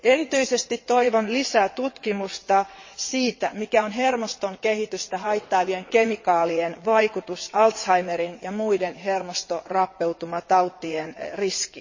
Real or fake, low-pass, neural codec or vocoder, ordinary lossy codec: fake; 7.2 kHz; vocoder, 22.05 kHz, 80 mel bands, WaveNeXt; MP3, 32 kbps